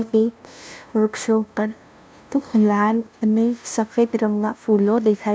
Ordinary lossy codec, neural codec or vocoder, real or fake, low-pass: none; codec, 16 kHz, 0.5 kbps, FunCodec, trained on LibriTTS, 25 frames a second; fake; none